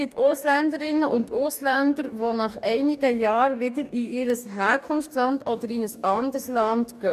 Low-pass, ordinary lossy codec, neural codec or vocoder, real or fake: 14.4 kHz; none; codec, 44.1 kHz, 2.6 kbps, DAC; fake